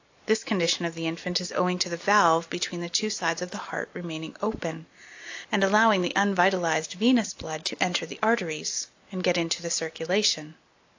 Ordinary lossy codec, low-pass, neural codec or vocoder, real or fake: AAC, 48 kbps; 7.2 kHz; none; real